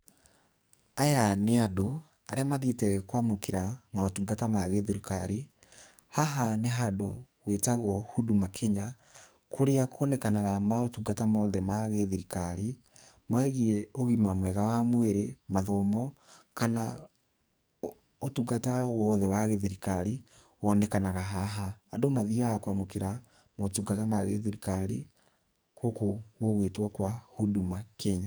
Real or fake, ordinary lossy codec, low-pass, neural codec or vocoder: fake; none; none; codec, 44.1 kHz, 2.6 kbps, SNAC